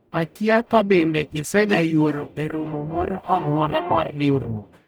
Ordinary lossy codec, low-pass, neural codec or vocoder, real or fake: none; none; codec, 44.1 kHz, 0.9 kbps, DAC; fake